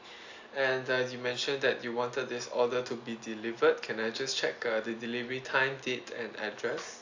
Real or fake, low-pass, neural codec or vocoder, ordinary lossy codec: real; 7.2 kHz; none; AAC, 48 kbps